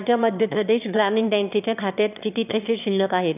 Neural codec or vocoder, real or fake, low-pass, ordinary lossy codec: autoencoder, 22.05 kHz, a latent of 192 numbers a frame, VITS, trained on one speaker; fake; 3.6 kHz; none